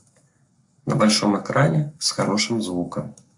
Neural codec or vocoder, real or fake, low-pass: codec, 44.1 kHz, 7.8 kbps, Pupu-Codec; fake; 10.8 kHz